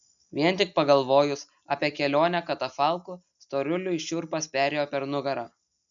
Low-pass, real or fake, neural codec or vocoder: 7.2 kHz; real; none